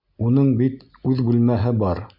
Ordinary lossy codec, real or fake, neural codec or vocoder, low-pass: MP3, 48 kbps; real; none; 5.4 kHz